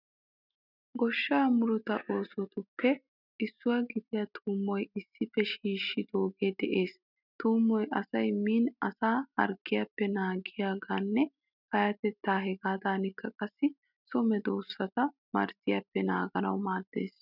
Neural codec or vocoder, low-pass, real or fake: none; 5.4 kHz; real